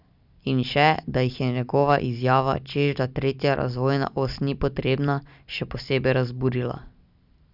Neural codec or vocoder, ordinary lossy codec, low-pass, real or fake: none; none; 5.4 kHz; real